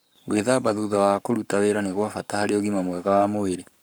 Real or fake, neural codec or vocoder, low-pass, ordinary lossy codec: fake; codec, 44.1 kHz, 7.8 kbps, Pupu-Codec; none; none